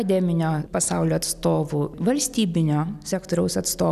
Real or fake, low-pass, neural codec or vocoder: real; 14.4 kHz; none